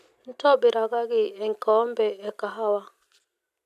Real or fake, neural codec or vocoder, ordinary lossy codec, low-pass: real; none; none; 14.4 kHz